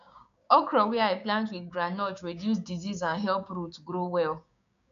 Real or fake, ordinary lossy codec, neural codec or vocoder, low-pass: fake; none; codec, 16 kHz, 6 kbps, DAC; 7.2 kHz